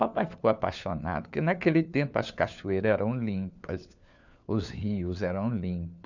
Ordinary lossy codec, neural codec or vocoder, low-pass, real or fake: none; codec, 16 kHz, 4 kbps, FunCodec, trained on LibriTTS, 50 frames a second; 7.2 kHz; fake